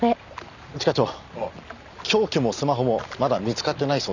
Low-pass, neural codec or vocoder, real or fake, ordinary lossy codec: 7.2 kHz; none; real; none